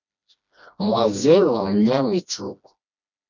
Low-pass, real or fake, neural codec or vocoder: 7.2 kHz; fake; codec, 16 kHz, 1 kbps, FreqCodec, smaller model